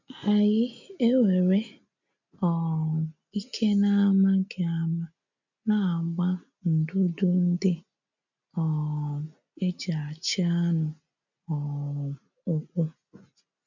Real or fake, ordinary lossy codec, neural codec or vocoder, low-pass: real; none; none; 7.2 kHz